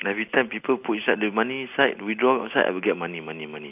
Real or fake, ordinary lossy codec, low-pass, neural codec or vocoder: real; AAC, 32 kbps; 3.6 kHz; none